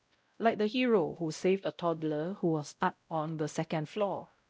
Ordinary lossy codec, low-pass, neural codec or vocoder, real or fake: none; none; codec, 16 kHz, 0.5 kbps, X-Codec, WavLM features, trained on Multilingual LibriSpeech; fake